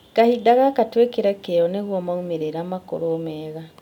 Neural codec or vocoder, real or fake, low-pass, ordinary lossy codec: none; real; 19.8 kHz; none